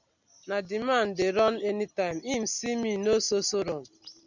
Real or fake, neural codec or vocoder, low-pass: real; none; 7.2 kHz